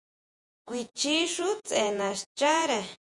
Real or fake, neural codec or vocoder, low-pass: fake; vocoder, 48 kHz, 128 mel bands, Vocos; 10.8 kHz